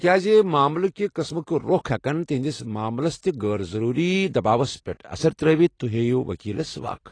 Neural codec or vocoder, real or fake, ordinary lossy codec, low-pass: none; real; AAC, 32 kbps; 9.9 kHz